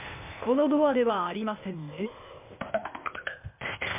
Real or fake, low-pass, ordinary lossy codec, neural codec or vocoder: fake; 3.6 kHz; MP3, 32 kbps; codec, 16 kHz, 0.8 kbps, ZipCodec